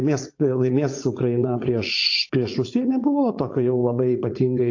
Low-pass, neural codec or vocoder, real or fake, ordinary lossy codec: 7.2 kHz; vocoder, 44.1 kHz, 80 mel bands, Vocos; fake; AAC, 48 kbps